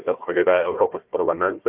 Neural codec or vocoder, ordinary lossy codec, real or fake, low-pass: codec, 16 kHz, 1 kbps, FunCodec, trained on Chinese and English, 50 frames a second; Opus, 24 kbps; fake; 3.6 kHz